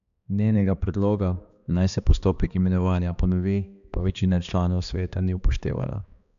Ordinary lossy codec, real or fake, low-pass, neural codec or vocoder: none; fake; 7.2 kHz; codec, 16 kHz, 2 kbps, X-Codec, HuBERT features, trained on balanced general audio